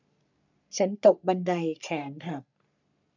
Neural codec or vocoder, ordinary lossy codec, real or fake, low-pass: codec, 44.1 kHz, 3.4 kbps, Pupu-Codec; none; fake; 7.2 kHz